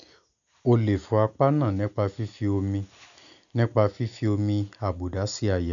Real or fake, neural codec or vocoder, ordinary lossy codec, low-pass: real; none; none; 7.2 kHz